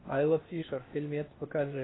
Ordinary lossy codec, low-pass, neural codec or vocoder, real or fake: AAC, 16 kbps; 7.2 kHz; codec, 16 kHz, 0.8 kbps, ZipCodec; fake